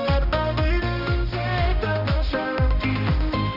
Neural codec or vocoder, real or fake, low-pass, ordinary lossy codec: codec, 16 kHz, 1 kbps, X-Codec, HuBERT features, trained on balanced general audio; fake; 5.4 kHz; none